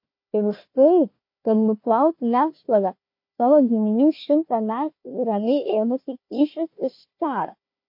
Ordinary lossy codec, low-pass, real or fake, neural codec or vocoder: MP3, 32 kbps; 5.4 kHz; fake; codec, 16 kHz, 1 kbps, FunCodec, trained on Chinese and English, 50 frames a second